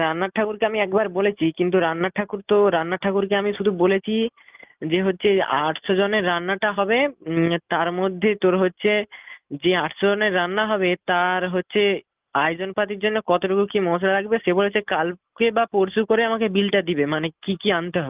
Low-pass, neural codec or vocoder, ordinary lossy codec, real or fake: 3.6 kHz; none; Opus, 32 kbps; real